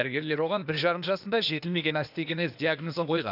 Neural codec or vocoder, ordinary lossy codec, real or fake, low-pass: codec, 16 kHz, 0.8 kbps, ZipCodec; none; fake; 5.4 kHz